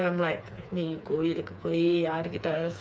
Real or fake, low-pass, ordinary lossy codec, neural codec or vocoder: fake; none; none; codec, 16 kHz, 4 kbps, FreqCodec, smaller model